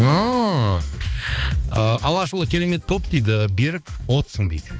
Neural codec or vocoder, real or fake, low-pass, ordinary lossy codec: codec, 16 kHz, 2 kbps, X-Codec, HuBERT features, trained on balanced general audio; fake; none; none